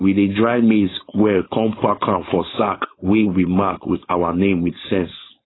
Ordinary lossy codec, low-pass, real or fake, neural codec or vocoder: AAC, 16 kbps; 7.2 kHz; fake; codec, 16 kHz, 4.8 kbps, FACodec